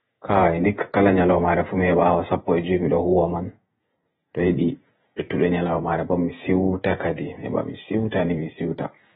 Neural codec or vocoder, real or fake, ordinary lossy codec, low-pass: vocoder, 48 kHz, 128 mel bands, Vocos; fake; AAC, 16 kbps; 19.8 kHz